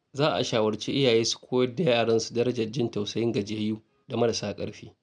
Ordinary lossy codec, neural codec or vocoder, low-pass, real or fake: none; none; 9.9 kHz; real